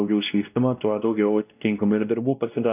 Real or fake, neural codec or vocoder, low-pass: fake; codec, 16 kHz, 1 kbps, X-Codec, WavLM features, trained on Multilingual LibriSpeech; 3.6 kHz